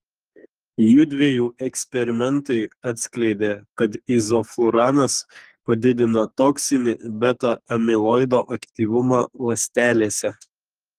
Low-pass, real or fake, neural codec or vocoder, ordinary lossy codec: 14.4 kHz; fake; codec, 44.1 kHz, 2.6 kbps, SNAC; Opus, 24 kbps